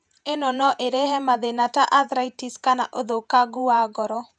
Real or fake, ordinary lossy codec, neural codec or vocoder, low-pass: fake; none; vocoder, 48 kHz, 128 mel bands, Vocos; 9.9 kHz